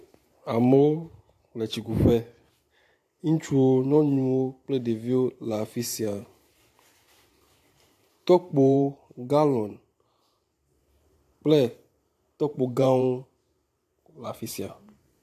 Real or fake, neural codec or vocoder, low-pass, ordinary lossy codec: fake; vocoder, 44.1 kHz, 128 mel bands every 512 samples, BigVGAN v2; 14.4 kHz; AAC, 64 kbps